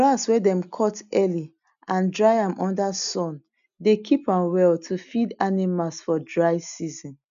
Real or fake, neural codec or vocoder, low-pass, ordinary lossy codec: real; none; 7.2 kHz; none